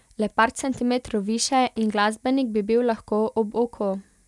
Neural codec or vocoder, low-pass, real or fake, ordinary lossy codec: none; none; real; none